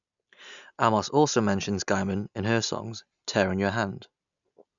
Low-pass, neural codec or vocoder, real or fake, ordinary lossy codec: 7.2 kHz; none; real; none